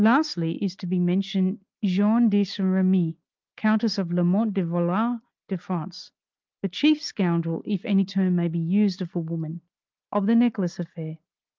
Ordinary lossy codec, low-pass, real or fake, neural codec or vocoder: Opus, 24 kbps; 7.2 kHz; real; none